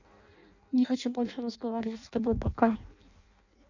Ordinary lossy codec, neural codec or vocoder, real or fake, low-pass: none; codec, 16 kHz in and 24 kHz out, 0.6 kbps, FireRedTTS-2 codec; fake; 7.2 kHz